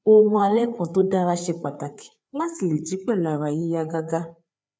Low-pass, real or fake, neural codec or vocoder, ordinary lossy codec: none; fake; codec, 16 kHz, 4 kbps, FreqCodec, larger model; none